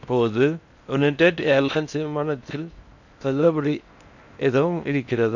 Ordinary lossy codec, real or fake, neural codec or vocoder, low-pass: none; fake; codec, 16 kHz in and 24 kHz out, 0.6 kbps, FocalCodec, streaming, 4096 codes; 7.2 kHz